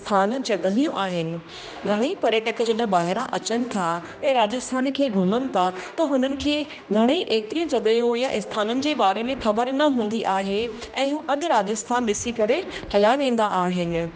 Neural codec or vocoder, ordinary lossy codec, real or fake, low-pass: codec, 16 kHz, 1 kbps, X-Codec, HuBERT features, trained on general audio; none; fake; none